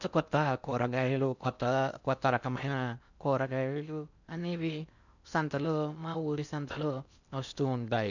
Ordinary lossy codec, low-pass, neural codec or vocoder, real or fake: none; 7.2 kHz; codec, 16 kHz in and 24 kHz out, 0.6 kbps, FocalCodec, streaming, 4096 codes; fake